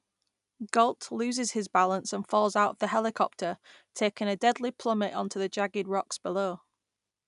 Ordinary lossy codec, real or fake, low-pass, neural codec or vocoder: none; real; 10.8 kHz; none